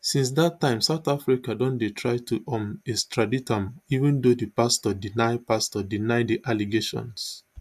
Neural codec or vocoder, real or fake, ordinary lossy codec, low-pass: none; real; AAC, 96 kbps; 14.4 kHz